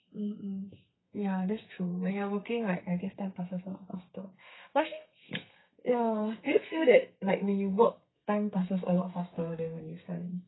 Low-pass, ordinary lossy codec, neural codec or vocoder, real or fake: 7.2 kHz; AAC, 16 kbps; codec, 32 kHz, 1.9 kbps, SNAC; fake